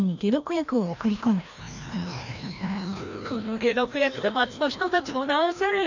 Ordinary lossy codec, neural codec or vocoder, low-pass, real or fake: none; codec, 16 kHz, 1 kbps, FreqCodec, larger model; 7.2 kHz; fake